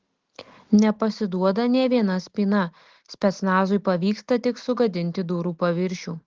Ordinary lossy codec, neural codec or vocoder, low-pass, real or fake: Opus, 16 kbps; none; 7.2 kHz; real